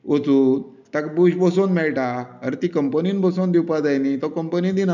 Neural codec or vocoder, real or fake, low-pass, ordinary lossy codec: none; real; 7.2 kHz; none